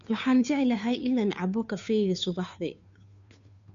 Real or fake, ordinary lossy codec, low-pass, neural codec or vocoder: fake; AAC, 96 kbps; 7.2 kHz; codec, 16 kHz, 2 kbps, FunCodec, trained on Chinese and English, 25 frames a second